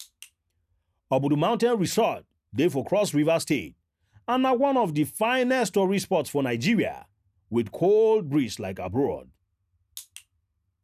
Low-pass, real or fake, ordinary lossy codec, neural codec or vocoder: 14.4 kHz; real; none; none